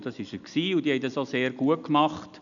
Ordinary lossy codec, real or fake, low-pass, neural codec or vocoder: none; real; 7.2 kHz; none